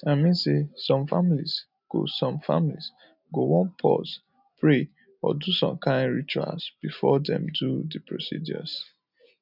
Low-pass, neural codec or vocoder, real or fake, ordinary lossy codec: 5.4 kHz; none; real; none